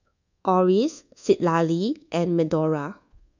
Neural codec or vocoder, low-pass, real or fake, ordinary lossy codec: codec, 24 kHz, 1.2 kbps, DualCodec; 7.2 kHz; fake; none